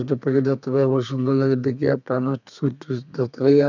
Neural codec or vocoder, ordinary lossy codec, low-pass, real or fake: codec, 32 kHz, 1.9 kbps, SNAC; none; 7.2 kHz; fake